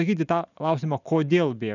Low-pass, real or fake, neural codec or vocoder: 7.2 kHz; real; none